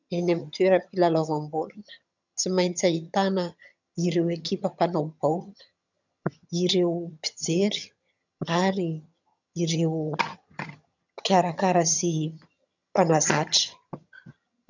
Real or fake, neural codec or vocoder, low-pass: fake; vocoder, 22.05 kHz, 80 mel bands, HiFi-GAN; 7.2 kHz